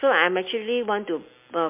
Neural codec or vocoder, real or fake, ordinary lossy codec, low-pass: none; real; MP3, 24 kbps; 3.6 kHz